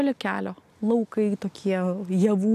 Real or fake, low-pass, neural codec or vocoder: real; 14.4 kHz; none